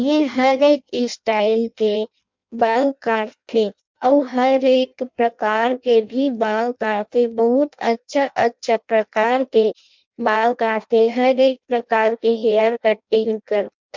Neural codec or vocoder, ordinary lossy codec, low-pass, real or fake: codec, 16 kHz in and 24 kHz out, 0.6 kbps, FireRedTTS-2 codec; MP3, 64 kbps; 7.2 kHz; fake